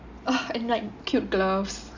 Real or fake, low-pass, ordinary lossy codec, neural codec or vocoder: real; 7.2 kHz; none; none